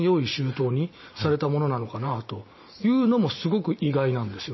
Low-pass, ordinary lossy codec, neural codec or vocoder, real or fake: 7.2 kHz; MP3, 24 kbps; none; real